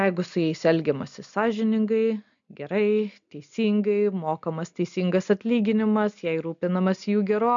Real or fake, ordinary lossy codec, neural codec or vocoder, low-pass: real; MP3, 96 kbps; none; 7.2 kHz